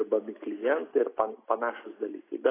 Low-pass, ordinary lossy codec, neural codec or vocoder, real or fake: 3.6 kHz; AAC, 16 kbps; none; real